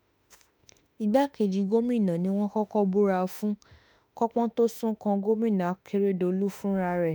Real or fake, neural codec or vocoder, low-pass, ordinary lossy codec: fake; autoencoder, 48 kHz, 32 numbers a frame, DAC-VAE, trained on Japanese speech; none; none